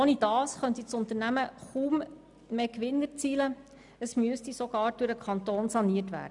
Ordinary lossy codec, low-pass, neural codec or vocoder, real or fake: none; 10.8 kHz; none; real